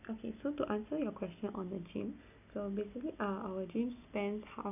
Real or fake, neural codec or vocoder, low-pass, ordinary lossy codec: real; none; 3.6 kHz; none